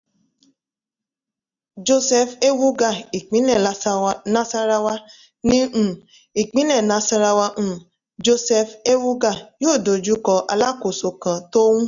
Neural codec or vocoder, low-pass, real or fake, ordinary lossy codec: none; 7.2 kHz; real; none